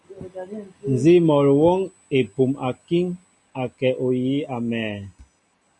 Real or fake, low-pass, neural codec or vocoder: real; 10.8 kHz; none